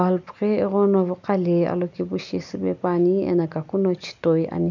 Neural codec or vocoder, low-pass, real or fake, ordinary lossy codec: none; 7.2 kHz; real; none